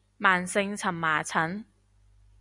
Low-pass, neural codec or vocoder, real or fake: 10.8 kHz; none; real